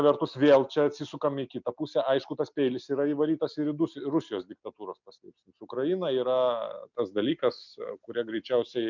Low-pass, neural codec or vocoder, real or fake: 7.2 kHz; none; real